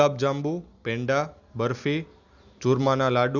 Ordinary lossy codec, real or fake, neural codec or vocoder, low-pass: Opus, 64 kbps; real; none; 7.2 kHz